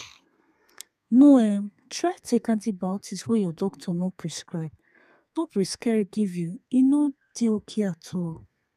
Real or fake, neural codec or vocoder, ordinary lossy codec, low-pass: fake; codec, 32 kHz, 1.9 kbps, SNAC; none; 14.4 kHz